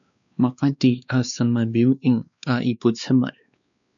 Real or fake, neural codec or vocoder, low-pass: fake; codec, 16 kHz, 2 kbps, X-Codec, WavLM features, trained on Multilingual LibriSpeech; 7.2 kHz